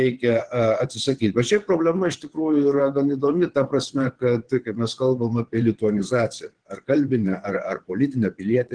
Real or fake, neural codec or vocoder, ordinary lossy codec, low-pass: fake; vocoder, 22.05 kHz, 80 mel bands, WaveNeXt; Opus, 16 kbps; 9.9 kHz